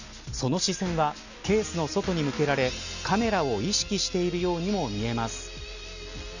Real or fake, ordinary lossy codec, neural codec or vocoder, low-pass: real; none; none; 7.2 kHz